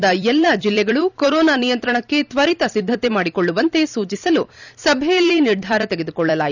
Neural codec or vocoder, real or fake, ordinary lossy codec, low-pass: vocoder, 44.1 kHz, 128 mel bands every 256 samples, BigVGAN v2; fake; none; 7.2 kHz